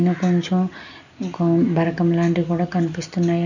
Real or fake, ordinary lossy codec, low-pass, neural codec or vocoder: real; none; 7.2 kHz; none